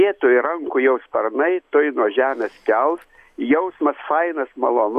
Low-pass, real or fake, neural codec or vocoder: 14.4 kHz; real; none